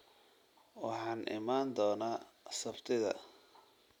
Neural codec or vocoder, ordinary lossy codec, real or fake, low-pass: none; none; real; 19.8 kHz